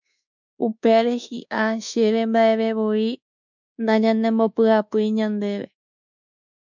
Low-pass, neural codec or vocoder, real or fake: 7.2 kHz; codec, 24 kHz, 1.2 kbps, DualCodec; fake